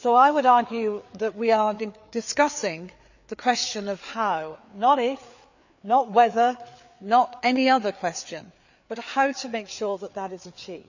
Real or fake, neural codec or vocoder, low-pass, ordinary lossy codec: fake; codec, 16 kHz, 4 kbps, FreqCodec, larger model; 7.2 kHz; none